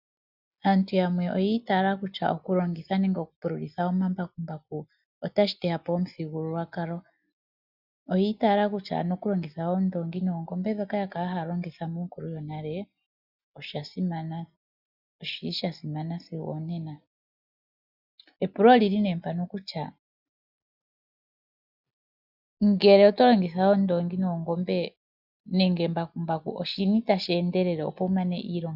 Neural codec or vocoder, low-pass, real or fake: none; 5.4 kHz; real